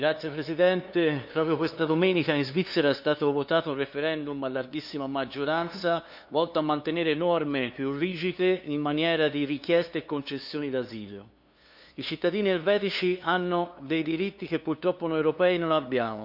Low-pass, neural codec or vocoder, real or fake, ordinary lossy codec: 5.4 kHz; codec, 16 kHz, 2 kbps, FunCodec, trained on LibriTTS, 25 frames a second; fake; none